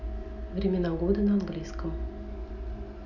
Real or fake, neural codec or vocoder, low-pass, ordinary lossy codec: real; none; 7.2 kHz; none